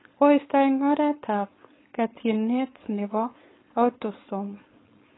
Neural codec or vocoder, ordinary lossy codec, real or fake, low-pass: codec, 16 kHz, 4.8 kbps, FACodec; AAC, 16 kbps; fake; 7.2 kHz